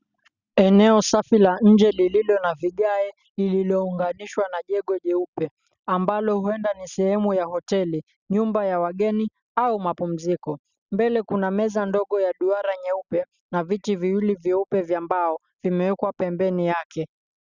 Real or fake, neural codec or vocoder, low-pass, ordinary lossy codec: real; none; 7.2 kHz; Opus, 64 kbps